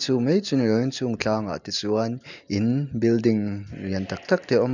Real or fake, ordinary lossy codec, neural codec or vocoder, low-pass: real; none; none; 7.2 kHz